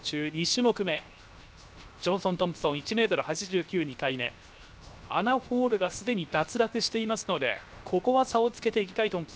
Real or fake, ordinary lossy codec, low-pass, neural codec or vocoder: fake; none; none; codec, 16 kHz, 0.7 kbps, FocalCodec